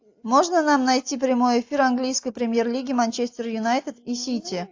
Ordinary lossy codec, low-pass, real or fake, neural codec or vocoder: AAC, 48 kbps; 7.2 kHz; real; none